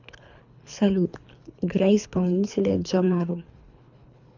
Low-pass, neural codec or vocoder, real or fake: 7.2 kHz; codec, 24 kHz, 3 kbps, HILCodec; fake